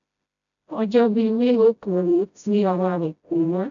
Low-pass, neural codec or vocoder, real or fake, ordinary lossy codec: 7.2 kHz; codec, 16 kHz, 0.5 kbps, FreqCodec, smaller model; fake; none